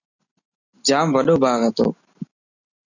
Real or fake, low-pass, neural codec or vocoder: real; 7.2 kHz; none